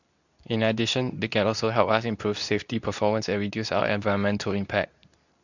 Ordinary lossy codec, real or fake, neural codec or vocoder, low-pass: none; fake; codec, 24 kHz, 0.9 kbps, WavTokenizer, medium speech release version 2; 7.2 kHz